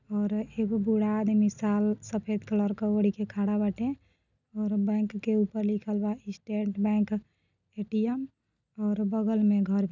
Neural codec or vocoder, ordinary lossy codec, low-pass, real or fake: none; none; 7.2 kHz; real